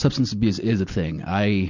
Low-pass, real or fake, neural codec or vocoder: 7.2 kHz; real; none